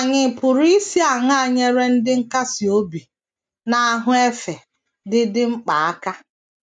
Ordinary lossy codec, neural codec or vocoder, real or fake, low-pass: none; none; real; 9.9 kHz